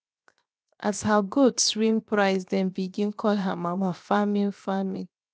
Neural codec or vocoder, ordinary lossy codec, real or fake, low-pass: codec, 16 kHz, 0.7 kbps, FocalCodec; none; fake; none